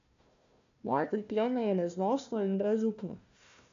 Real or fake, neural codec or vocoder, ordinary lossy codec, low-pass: fake; codec, 16 kHz, 1 kbps, FunCodec, trained on Chinese and English, 50 frames a second; MP3, 64 kbps; 7.2 kHz